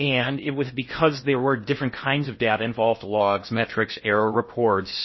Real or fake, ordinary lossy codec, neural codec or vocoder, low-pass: fake; MP3, 24 kbps; codec, 16 kHz in and 24 kHz out, 0.6 kbps, FocalCodec, streaming, 4096 codes; 7.2 kHz